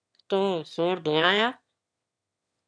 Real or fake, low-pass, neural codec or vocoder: fake; 9.9 kHz; autoencoder, 22.05 kHz, a latent of 192 numbers a frame, VITS, trained on one speaker